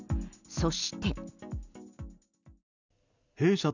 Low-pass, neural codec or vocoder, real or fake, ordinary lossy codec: 7.2 kHz; none; real; none